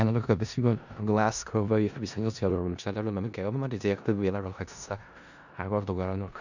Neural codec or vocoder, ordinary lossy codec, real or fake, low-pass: codec, 16 kHz in and 24 kHz out, 0.4 kbps, LongCat-Audio-Codec, four codebook decoder; none; fake; 7.2 kHz